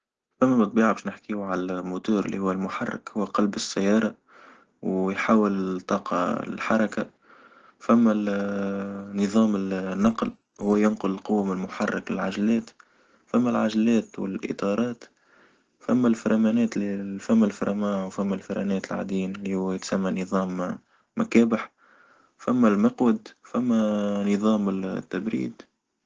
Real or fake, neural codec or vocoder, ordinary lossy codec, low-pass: real; none; Opus, 16 kbps; 7.2 kHz